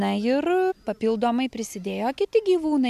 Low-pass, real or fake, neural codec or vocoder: 14.4 kHz; real; none